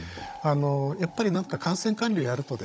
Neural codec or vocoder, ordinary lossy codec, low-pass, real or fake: codec, 16 kHz, 16 kbps, FunCodec, trained on Chinese and English, 50 frames a second; none; none; fake